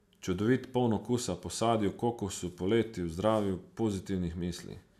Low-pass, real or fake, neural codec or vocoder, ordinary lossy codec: 14.4 kHz; real; none; none